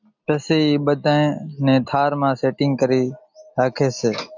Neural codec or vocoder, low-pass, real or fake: none; 7.2 kHz; real